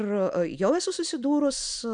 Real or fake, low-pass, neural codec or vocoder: real; 9.9 kHz; none